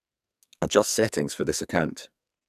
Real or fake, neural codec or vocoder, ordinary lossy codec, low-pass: fake; codec, 44.1 kHz, 2.6 kbps, SNAC; none; 14.4 kHz